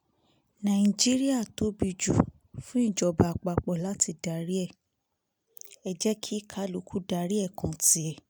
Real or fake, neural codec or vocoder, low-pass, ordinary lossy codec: real; none; none; none